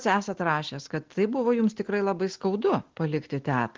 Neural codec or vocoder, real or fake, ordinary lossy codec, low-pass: none; real; Opus, 16 kbps; 7.2 kHz